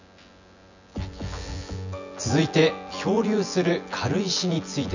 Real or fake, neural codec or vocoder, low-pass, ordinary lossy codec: fake; vocoder, 24 kHz, 100 mel bands, Vocos; 7.2 kHz; none